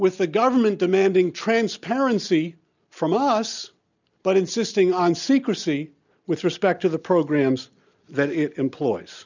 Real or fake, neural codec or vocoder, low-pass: real; none; 7.2 kHz